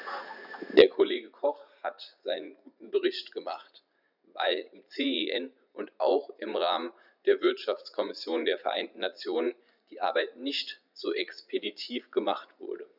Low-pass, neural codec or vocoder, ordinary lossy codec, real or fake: 5.4 kHz; vocoder, 44.1 kHz, 80 mel bands, Vocos; none; fake